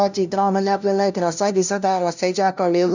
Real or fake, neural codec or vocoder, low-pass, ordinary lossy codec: fake; codec, 16 kHz, 0.5 kbps, FunCodec, trained on LibriTTS, 25 frames a second; 7.2 kHz; none